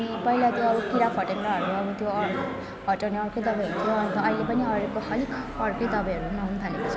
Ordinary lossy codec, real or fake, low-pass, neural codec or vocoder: none; real; none; none